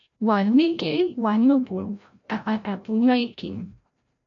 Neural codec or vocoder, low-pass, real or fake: codec, 16 kHz, 0.5 kbps, FreqCodec, larger model; 7.2 kHz; fake